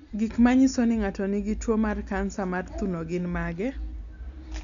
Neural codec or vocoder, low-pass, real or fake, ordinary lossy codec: none; 7.2 kHz; real; none